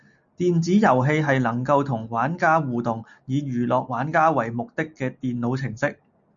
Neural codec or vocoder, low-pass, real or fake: none; 7.2 kHz; real